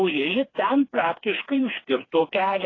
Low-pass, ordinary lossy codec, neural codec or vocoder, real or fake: 7.2 kHz; AAC, 32 kbps; codec, 16 kHz, 2 kbps, FreqCodec, smaller model; fake